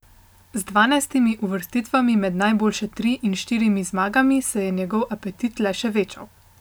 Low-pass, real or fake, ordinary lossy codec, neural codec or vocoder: none; real; none; none